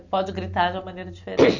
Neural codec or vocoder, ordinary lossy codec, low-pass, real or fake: none; none; 7.2 kHz; real